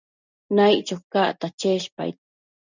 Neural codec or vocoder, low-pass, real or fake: none; 7.2 kHz; real